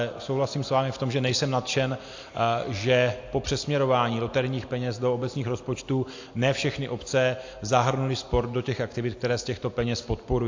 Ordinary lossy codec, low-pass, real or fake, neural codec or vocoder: AAC, 48 kbps; 7.2 kHz; real; none